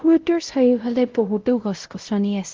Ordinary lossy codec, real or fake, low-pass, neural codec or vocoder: Opus, 16 kbps; fake; 7.2 kHz; codec, 16 kHz, 0.5 kbps, X-Codec, WavLM features, trained on Multilingual LibriSpeech